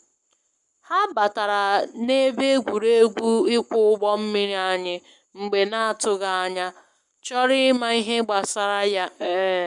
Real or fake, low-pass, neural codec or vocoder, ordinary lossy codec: fake; 10.8 kHz; codec, 44.1 kHz, 7.8 kbps, Pupu-Codec; none